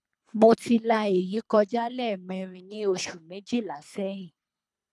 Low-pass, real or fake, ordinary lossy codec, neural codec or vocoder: none; fake; none; codec, 24 kHz, 3 kbps, HILCodec